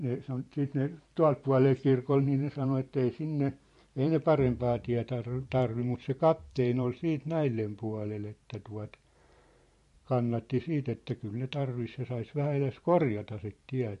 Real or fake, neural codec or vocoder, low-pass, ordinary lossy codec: fake; vocoder, 44.1 kHz, 128 mel bands every 512 samples, BigVGAN v2; 14.4 kHz; MP3, 48 kbps